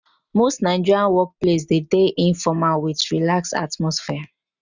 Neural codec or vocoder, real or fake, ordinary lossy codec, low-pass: none; real; none; 7.2 kHz